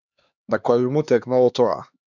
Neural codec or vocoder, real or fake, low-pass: codec, 16 kHz, 2 kbps, X-Codec, HuBERT features, trained on LibriSpeech; fake; 7.2 kHz